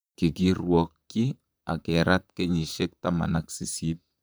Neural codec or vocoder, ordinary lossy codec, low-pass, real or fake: vocoder, 44.1 kHz, 128 mel bands, Pupu-Vocoder; none; none; fake